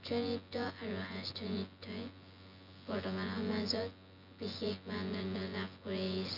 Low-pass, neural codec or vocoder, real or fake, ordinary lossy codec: 5.4 kHz; vocoder, 24 kHz, 100 mel bands, Vocos; fake; none